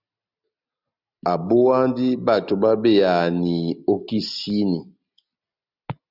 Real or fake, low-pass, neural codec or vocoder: real; 5.4 kHz; none